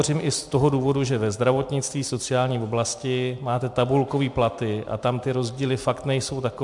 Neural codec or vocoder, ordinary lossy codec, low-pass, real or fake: none; MP3, 64 kbps; 10.8 kHz; real